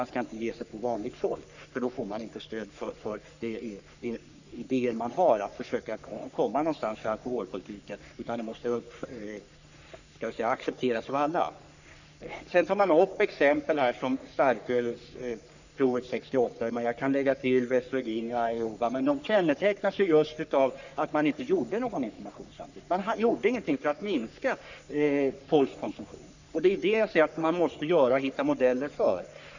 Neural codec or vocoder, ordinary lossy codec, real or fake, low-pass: codec, 44.1 kHz, 3.4 kbps, Pupu-Codec; none; fake; 7.2 kHz